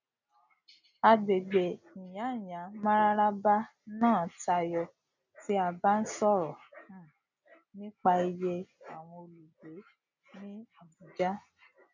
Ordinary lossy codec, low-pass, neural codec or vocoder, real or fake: none; 7.2 kHz; none; real